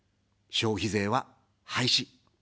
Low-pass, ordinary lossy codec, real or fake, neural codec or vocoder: none; none; real; none